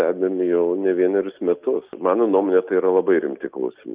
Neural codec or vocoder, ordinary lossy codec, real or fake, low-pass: none; Opus, 32 kbps; real; 3.6 kHz